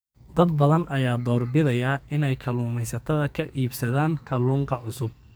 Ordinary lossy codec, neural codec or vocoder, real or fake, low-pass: none; codec, 44.1 kHz, 2.6 kbps, SNAC; fake; none